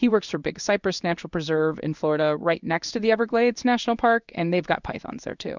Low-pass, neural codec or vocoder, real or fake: 7.2 kHz; codec, 16 kHz in and 24 kHz out, 1 kbps, XY-Tokenizer; fake